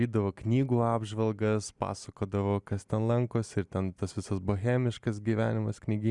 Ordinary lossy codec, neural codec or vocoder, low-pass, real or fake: Opus, 64 kbps; none; 10.8 kHz; real